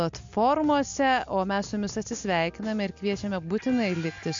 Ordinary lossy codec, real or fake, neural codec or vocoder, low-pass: MP3, 64 kbps; real; none; 7.2 kHz